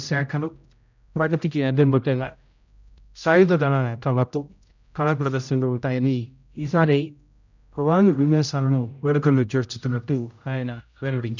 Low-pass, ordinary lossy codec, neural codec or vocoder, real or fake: 7.2 kHz; none; codec, 16 kHz, 0.5 kbps, X-Codec, HuBERT features, trained on general audio; fake